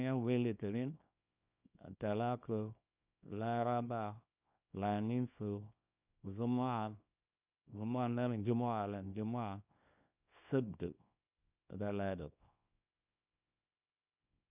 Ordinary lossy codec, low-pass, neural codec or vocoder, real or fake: none; 3.6 kHz; codec, 24 kHz, 0.9 kbps, WavTokenizer, small release; fake